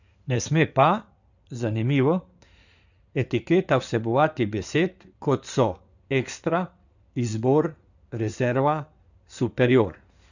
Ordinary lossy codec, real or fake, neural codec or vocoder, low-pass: none; fake; codec, 16 kHz in and 24 kHz out, 2.2 kbps, FireRedTTS-2 codec; 7.2 kHz